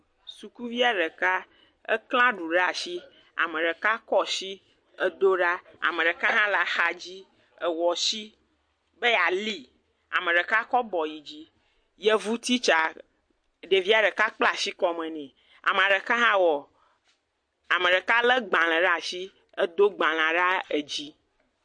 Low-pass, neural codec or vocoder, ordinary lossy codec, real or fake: 9.9 kHz; none; MP3, 48 kbps; real